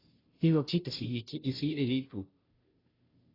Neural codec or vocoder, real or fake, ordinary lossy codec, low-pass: codec, 16 kHz, 0.5 kbps, FunCodec, trained on Chinese and English, 25 frames a second; fake; AAC, 24 kbps; 5.4 kHz